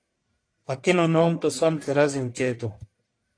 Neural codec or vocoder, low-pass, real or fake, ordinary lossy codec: codec, 44.1 kHz, 1.7 kbps, Pupu-Codec; 9.9 kHz; fake; AAC, 48 kbps